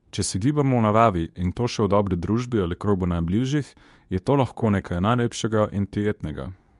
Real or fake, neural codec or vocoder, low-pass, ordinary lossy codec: fake; codec, 24 kHz, 0.9 kbps, WavTokenizer, medium speech release version 2; 10.8 kHz; MP3, 64 kbps